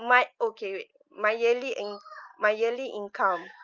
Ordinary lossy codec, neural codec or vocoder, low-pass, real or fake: Opus, 24 kbps; none; 7.2 kHz; real